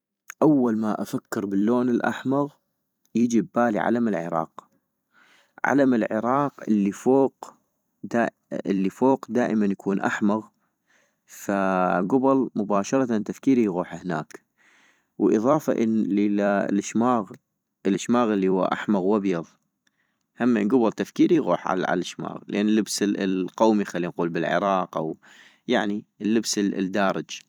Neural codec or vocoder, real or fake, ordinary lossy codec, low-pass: vocoder, 44.1 kHz, 128 mel bands every 256 samples, BigVGAN v2; fake; none; 19.8 kHz